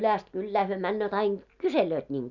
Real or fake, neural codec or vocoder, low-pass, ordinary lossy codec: real; none; 7.2 kHz; none